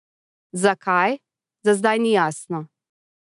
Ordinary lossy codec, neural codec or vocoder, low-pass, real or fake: none; none; 10.8 kHz; real